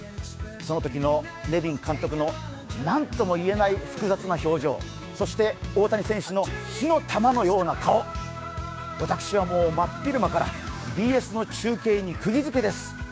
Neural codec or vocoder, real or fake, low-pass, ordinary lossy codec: codec, 16 kHz, 6 kbps, DAC; fake; none; none